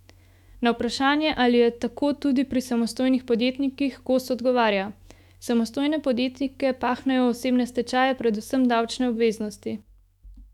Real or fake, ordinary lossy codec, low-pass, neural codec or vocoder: fake; none; 19.8 kHz; autoencoder, 48 kHz, 128 numbers a frame, DAC-VAE, trained on Japanese speech